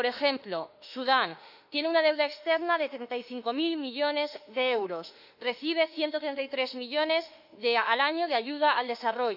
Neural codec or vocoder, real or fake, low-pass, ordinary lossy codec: autoencoder, 48 kHz, 32 numbers a frame, DAC-VAE, trained on Japanese speech; fake; 5.4 kHz; none